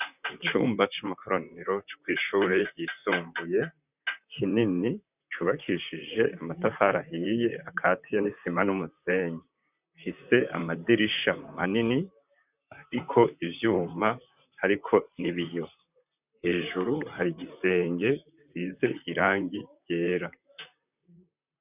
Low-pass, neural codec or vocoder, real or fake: 3.6 kHz; vocoder, 44.1 kHz, 128 mel bands, Pupu-Vocoder; fake